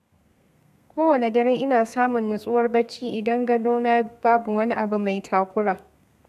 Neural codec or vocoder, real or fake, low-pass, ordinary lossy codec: codec, 32 kHz, 1.9 kbps, SNAC; fake; 14.4 kHz; none